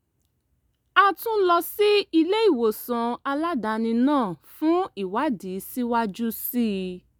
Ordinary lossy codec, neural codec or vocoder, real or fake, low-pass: none; none; real; none